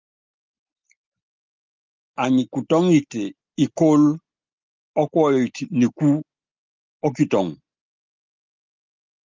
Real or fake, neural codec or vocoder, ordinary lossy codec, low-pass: real; none; Opus, 32 kbps; 7.2 kHz